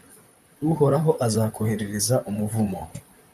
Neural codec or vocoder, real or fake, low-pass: vocoder, 44.1 kHz, 128 mel bands, Pupu-Vocoder; fake; 14.4 kHz